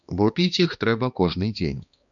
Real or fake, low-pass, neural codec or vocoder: fake; 7.2 kHz; codec, 16 kHz, 2 kbps, X-Codec, HuBERT features, trained on balanced general audio